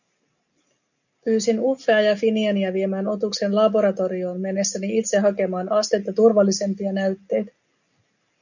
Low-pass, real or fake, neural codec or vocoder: 7.2 kHz; real; none